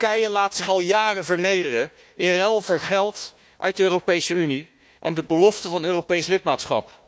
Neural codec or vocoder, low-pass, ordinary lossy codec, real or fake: codec, 16 kHz, 1 kbps, FunCodec, trained on Chinese and English, 50 frames a second; none; none; fake